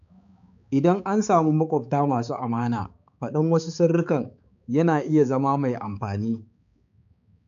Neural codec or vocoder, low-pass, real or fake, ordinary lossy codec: codec, 16 kHz, 4 kbps, X-Codec, HuBERT features, trained on balanced general audio; 7.2 kHz; fake; none